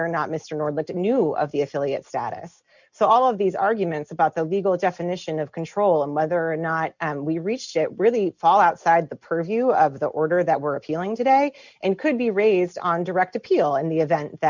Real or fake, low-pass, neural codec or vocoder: real; 7.2 kHz; none